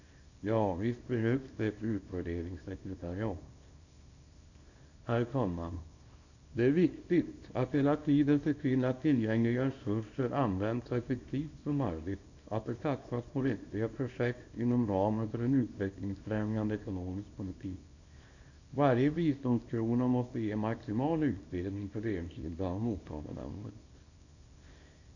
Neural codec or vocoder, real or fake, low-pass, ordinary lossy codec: codec, 24 kHz, 0.9 kbps, WavTokenizer, small release; fake; 7.2 kHz; none